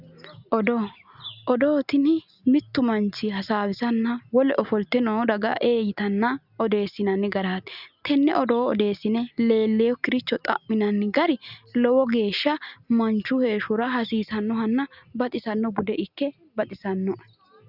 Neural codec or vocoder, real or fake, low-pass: none; real; 5.4 kHz